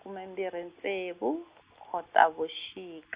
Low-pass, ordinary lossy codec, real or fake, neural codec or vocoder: 3.6 kHz; Opus, 64 kbps; real; none